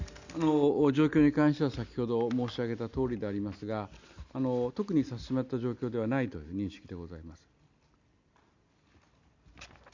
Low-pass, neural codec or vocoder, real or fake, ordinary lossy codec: 7.2 kHz; none; real; Opus, 64 kbps